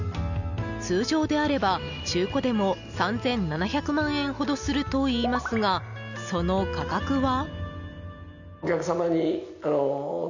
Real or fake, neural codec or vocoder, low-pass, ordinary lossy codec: real; none; 7.2 kHz; none